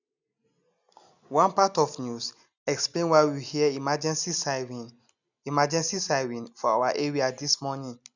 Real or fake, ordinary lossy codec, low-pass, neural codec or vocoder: real; none; 7.2 kHz; none